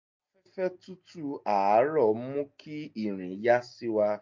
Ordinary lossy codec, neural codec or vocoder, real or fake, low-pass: MP3, 48 kbps; none; real; 7.2 kHz